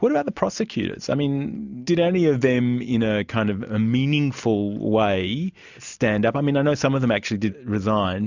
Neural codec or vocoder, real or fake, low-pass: none; real; 7.2 kHz